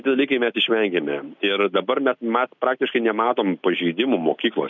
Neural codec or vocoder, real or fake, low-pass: none; real; 7.2 kHz